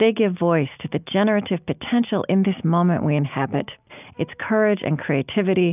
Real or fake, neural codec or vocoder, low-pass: real; none; 3.6 kHz